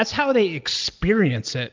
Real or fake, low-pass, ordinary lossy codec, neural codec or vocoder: real; 7.2 kHz; Opus, 24 kbps; none